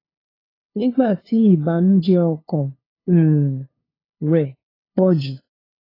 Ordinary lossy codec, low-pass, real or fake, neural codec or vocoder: AAC, 24 kbps; 5.4 kHz; fake; codec, 16 kHz, 2 kbps, FunCodec, trained on LibriTTS, 25 frames a second